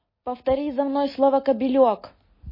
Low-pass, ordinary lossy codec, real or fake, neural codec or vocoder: 5.4 kHz; MP3, 24 kbps; real; none